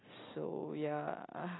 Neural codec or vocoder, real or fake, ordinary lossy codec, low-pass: none; real; AAC, 16 kbps; 7.2 kHz